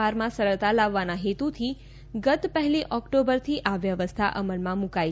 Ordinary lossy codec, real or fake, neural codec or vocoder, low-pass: none; real; none; none